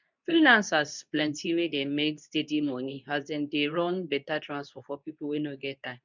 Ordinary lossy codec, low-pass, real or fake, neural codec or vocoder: none; 7.2 kHz; fake; codec, 24 kHz, 0.9 kbps, WavTokenizer, medium speech release version 2